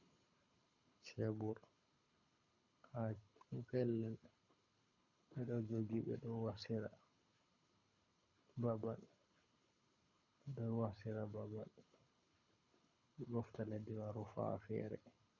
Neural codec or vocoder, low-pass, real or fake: codec, 24 kHz, 6 kbps, HILCodec; 7.2 kHz; fake